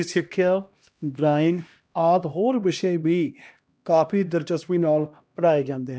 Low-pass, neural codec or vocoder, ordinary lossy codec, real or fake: none; codec, 16 kHz, 1 kbps, X-Codec, HuBERT features, trained on LibriSpeech; none; fake